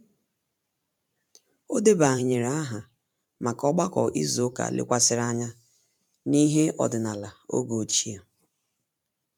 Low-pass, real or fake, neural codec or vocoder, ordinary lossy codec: 19.8 kHz; fake; vocoder, 44.1 kHz, 128 mel bands every 512 samples, BigVGAN v2; none